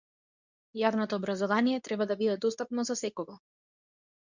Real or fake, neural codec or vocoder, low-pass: fake; codec, 24 kHz, 0.9 kbps, WavTokenizer, medium speech release version 2; 7.2 kHz